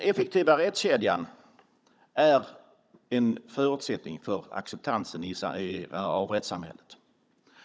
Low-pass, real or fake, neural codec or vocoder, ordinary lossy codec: none; fake; codec, 16 kHz, 16 kbps, FunCodec, trained on Chinese and English, 50 frames a second; none